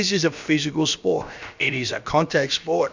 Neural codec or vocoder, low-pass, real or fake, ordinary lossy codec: codec, 16 kHz, about 1 kbps, DyCAST, with the encoder's durations; 7.2 kHz; fake; Opus, 64 kbps